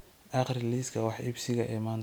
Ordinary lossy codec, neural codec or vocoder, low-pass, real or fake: none; none; none; real